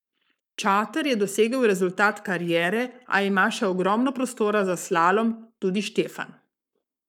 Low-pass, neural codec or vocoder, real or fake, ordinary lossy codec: 19.8 kHz; codec, 44.1 kHz, 7.8 kbps, Pupu-Codec; fake; none